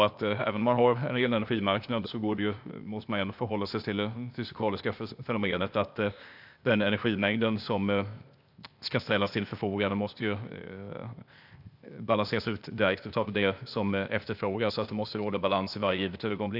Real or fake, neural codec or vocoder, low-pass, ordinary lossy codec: fake; codec, 16 kHz, 0.8 kbps, ZipCodec; 5.4 kHz; none